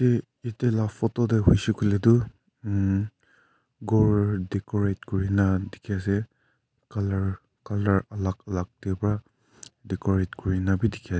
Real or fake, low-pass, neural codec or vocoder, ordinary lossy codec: real; none; none; none